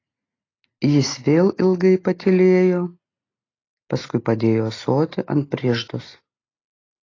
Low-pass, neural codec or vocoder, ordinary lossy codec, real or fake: 7.2 kHz; none; AAC, 32 kbps; real